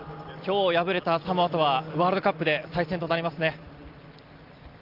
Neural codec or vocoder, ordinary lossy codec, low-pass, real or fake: none; Opus, 32 kbps; 5.4 kHz; real